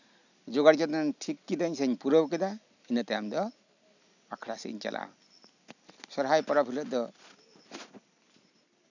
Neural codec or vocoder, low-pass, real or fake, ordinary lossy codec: none; 7.2 kHz; real; none